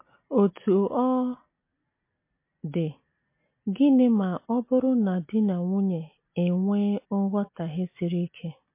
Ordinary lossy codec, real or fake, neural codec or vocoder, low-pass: MP3, 24 kbps; real; none; 3.6 kHz